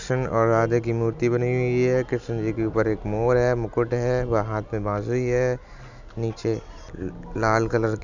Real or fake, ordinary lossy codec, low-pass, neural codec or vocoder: real; none; 7.2 kHz; none